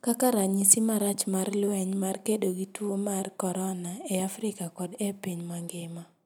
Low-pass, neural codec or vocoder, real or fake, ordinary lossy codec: none; none; real; none